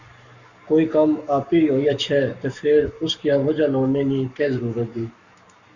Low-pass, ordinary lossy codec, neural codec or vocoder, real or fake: 7.2 kHz; Opus, 64 kbps; codec, 44.1 kHz, 7.8 kbps, Pupu-Codec; fake